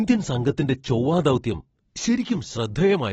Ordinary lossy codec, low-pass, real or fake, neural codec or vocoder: AAC, 24 kbps; 19.8 kHz; real; none